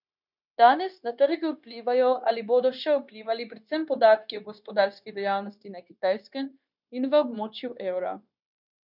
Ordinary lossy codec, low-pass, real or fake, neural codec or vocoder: none; 5.4 kHz; fake; codec, 16 kHz, 0.9 kbps, LongCat-Audio-Codec